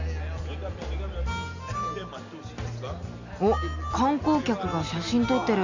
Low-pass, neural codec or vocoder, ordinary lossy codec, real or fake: 7.2 kHz; none; none; real